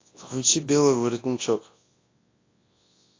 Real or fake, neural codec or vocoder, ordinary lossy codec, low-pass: fake; codec, 24 kHz, 0.9 kbps, WavTokenizer, large speech release; AAC, 32 kbps; 7.2 kHz